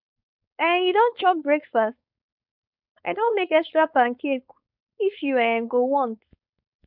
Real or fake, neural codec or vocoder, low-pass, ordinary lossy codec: fake; codec, 16 kHz, 4.8 kbps, FACodec; 5.4 kHz; none